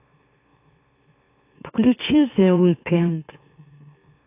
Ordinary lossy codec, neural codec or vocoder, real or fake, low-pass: AAC, 24 kbps; autoencoder, 44.1 kHz, a latent of 192 numbers a frame, MeloTTS; fake; 3.6 kHz